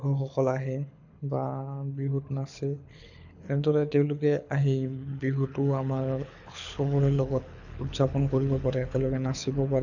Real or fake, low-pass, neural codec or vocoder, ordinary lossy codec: fake; 7.2 kHz; codec, 24 kHz, 6 kbps, HILCodec; none